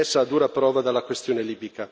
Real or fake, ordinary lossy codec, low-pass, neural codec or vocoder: real; none; none; none